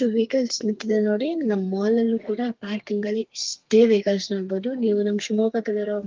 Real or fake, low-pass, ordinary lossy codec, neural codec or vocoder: fake; 7.2 kHz; Opus, 32 kbps; codec, 32 kHz, 1.9 kbps, SNAC